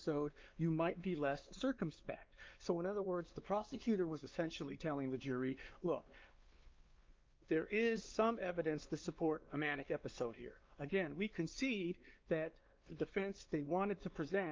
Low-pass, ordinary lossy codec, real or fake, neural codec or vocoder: 7.2 kHz; Opus, 32 kbps; fake; codec, 16 kHz, 2 kbps, X-Codec, WavLM features, trained on Multilingual LibriSpeech